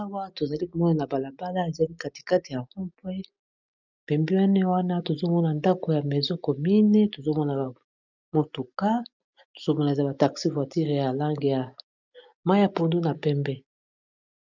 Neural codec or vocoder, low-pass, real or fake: none; 7.2 kHz; real